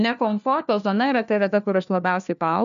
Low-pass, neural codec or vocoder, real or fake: 7.2 kHz; codec, 16 kHz, 1 kbps, FunCodec, trained on LibriTTS, 50 frames a second; fake